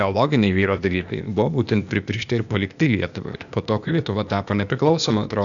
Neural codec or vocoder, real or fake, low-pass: codec, 16 kHz, 0.8 kbps, ZipCodec; fake; 7.2 kHz